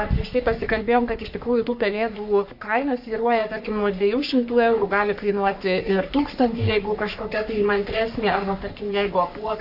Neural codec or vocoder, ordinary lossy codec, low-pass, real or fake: codec, 44.1 kHz, 3.4 kbps, Pupu-Codec; AAC, 48 kbps; 5.4 kHz; fake